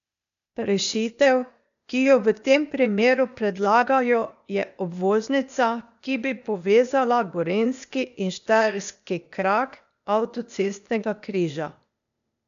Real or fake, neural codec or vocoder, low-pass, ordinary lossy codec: fake; codec, 16 kHz, 0.8 kbps, ZipCodec; 7.2 kHz; none